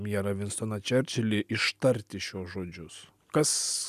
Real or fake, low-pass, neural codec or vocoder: real; 14.4 kHz; none